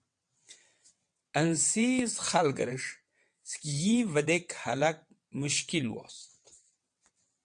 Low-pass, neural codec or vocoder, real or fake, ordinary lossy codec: 9.9 kHz; vocoder, 22.05 kHz, 80 mel bands, WaveNeXt; fake; MP3, 64 kbps